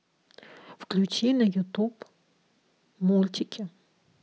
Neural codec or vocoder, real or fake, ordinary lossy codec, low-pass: none; real; none; none